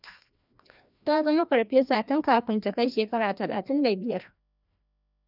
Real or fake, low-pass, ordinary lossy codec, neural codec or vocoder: fake; 5.4 kHz; none; codec, 16 kHz, 1 kbps, FreqCodec, larger model